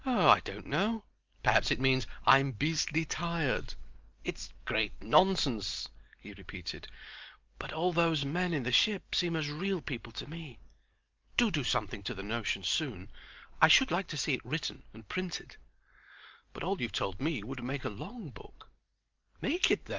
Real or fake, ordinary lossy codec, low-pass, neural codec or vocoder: real; Opus, 32 kbps; 7.2 kHz; none